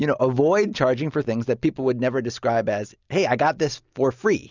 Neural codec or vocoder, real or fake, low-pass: none; real; 7.2 kHz